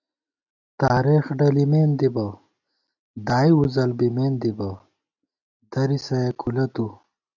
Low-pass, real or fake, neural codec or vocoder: 7.2 kHz; real; none